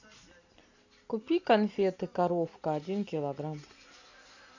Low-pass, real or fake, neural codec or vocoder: 7.2 kHz; real; none